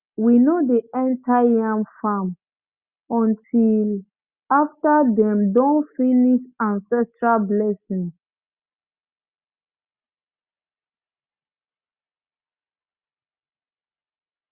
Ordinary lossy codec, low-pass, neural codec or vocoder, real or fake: Opus, 64 kbps; 3.6 kHz; none; real